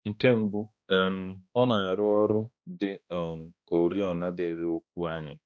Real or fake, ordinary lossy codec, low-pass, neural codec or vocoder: fake; none; none; codec, 16 kHz, 1 kbps, X-Codec, HuBERT features, trained on balanced general audio